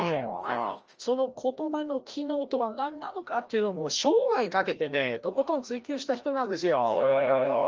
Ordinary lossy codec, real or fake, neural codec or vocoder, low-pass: Opus, 24 kbps; fake; codec, 16 kHz, 1 kbps, FreqCodec, larger model; 7.2 kHz